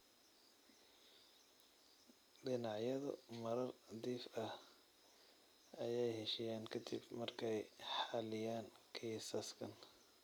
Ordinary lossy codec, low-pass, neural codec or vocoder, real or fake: none; none; none; real